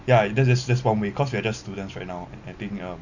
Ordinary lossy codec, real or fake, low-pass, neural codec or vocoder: none; real; 7.2 kHz; none